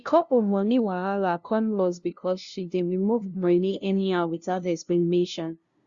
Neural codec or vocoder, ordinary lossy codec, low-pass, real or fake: codec, 16 kHz, 0.5 kbps, FunCodec, trained on LibriTTS, 25 frames a second; Opus, 64 kbps; 7.2 kHz; fake